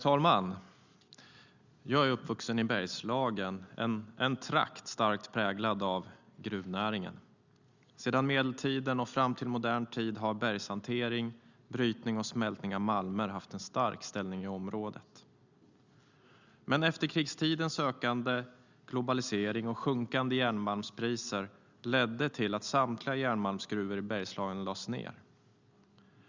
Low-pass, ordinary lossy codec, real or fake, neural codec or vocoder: 7.2 kHz; Opus, 64 kbps; real; none